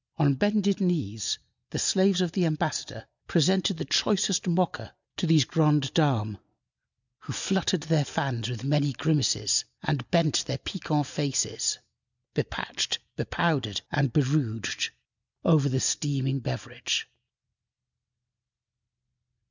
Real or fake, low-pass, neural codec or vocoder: real; 7.2 kHz; none